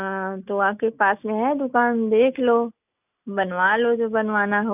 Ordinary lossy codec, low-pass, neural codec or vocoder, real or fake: none; 3.6 kHz; none; real